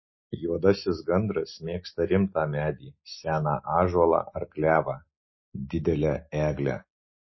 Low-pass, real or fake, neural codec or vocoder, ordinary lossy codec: 7.2 kHz; real; none; MP3, 24 kbps